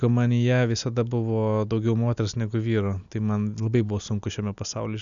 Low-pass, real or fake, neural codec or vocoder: 7.2 kHz; real; none